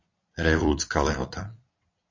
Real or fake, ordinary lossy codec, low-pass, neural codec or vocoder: fake; MP3, 48 kbps; 7.2 kHz; vocoder, 22.05 kHz, 80 mel bands, Vocos